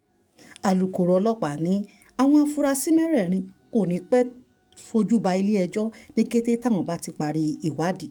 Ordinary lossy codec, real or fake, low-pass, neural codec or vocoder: none; fake; 19.8 kHz; codec, 44.1 kHz, 7.8 kbps, DAC